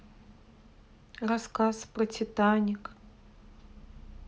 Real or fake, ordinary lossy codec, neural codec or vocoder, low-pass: real; none; none; none